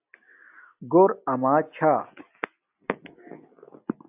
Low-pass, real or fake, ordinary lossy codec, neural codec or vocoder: 3.6 kHz; real; AAC, 32 kbps; none